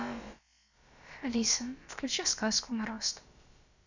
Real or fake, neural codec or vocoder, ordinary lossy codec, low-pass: fake; codec, 16 kHz, about 1 kbps, DyCAST, with the encoder's durations; Opus, 64 kbps; 7.2 kHz